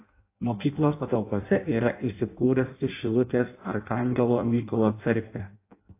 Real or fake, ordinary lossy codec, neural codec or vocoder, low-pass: fake; AAC, 24 kbps; codec, 16 kHz in and 24 kHz out, 0.6 kbps, FireRedTTS-2 codec; 3.6 kHz